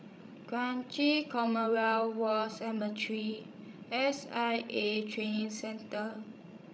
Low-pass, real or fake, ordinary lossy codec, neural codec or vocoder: none; fake; none; codec, 16 kHz, 16 kbps, FreqCodec, larger model